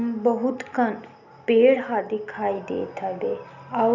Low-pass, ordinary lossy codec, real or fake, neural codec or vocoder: 7.2 kHz; none; real; none